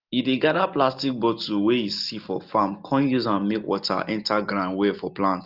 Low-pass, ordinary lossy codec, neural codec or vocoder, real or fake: 5.4 kHz; Opus, 32 kbps; none; real